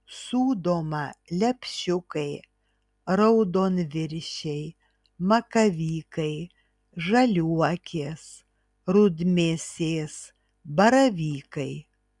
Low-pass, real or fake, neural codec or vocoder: 10.8 kHz; real; none